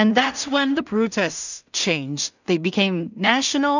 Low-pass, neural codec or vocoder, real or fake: 7.2 kHz; codec, 16 kHz in and 24 kHz out, 0.4 kbps, LongCat-Audio-Codec, two codebook decoder; fake